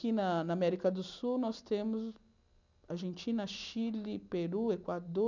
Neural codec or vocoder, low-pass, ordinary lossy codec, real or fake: none; 7.2 kHz; none; real